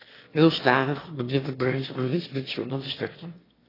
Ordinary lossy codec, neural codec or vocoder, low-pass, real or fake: AAC, 24 kbps; autoencoder, 22.05 kHz, a latent of 192 numbers a frame, VITS, trained on one speaker; 5.4 kHz; fake